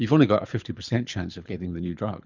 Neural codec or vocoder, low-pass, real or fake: codec, 24 kHz, 6 kbps, HILCodec; 7.2 kHz; fake